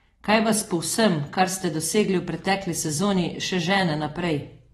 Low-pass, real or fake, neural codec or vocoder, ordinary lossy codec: 19.8 kHz; fake; vocoder, 44.1 kHz, 128 mel bands every 512 samples, BigVGAN v2; AAC, 32 kbps